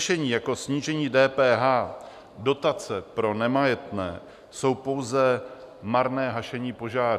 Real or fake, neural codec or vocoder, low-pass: real; none; 14.4 kHz